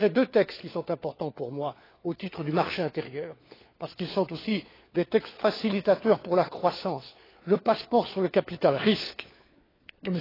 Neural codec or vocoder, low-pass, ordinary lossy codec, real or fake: codec, 16 kHz, 4 kbps, FunCodec, trained on LibriTTS, 50 frames a second; 5.4 kHz; AAC, 24 kbps; fake